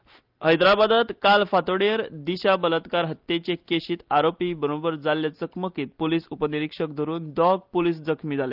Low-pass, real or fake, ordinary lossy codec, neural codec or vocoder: 5.4 kHz; real; Opus, 32 kbps; none